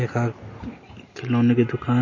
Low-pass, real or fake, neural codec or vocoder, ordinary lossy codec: 7.2 kHz; real; none; MP3, 32 kbps